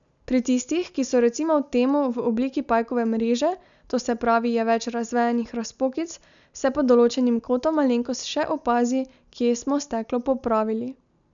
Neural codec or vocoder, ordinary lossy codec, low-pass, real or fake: none; none; 7.2 kHz; real